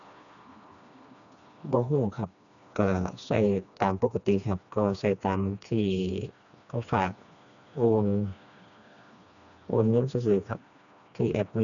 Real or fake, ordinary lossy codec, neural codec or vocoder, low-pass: fake; none; codec, 16 kHz, 2 kbps, FreqCodec, smaller model; 7.2 kHz